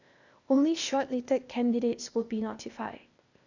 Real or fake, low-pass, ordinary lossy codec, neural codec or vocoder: fake; 7.2 kHz; none; codec, 16 kHz, 0.8 kbps, ZipCodec